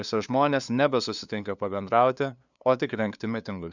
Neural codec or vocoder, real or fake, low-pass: codec, 16 kHz, 2 kbps, FunCodec, trained on LibriTTS, 25 frames a second; fake; 7.2 kHz